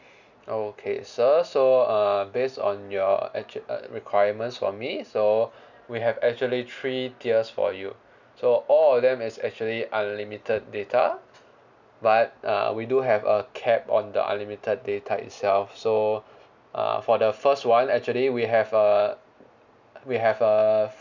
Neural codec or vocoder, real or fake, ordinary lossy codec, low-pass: none; real; none; 7.2 kHz